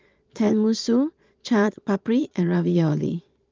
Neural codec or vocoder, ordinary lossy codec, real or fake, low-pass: vocoder, 22.05 kHz, 80 mel bands, Vocos; Opus, 32 kbps; fake; 7.2 kHz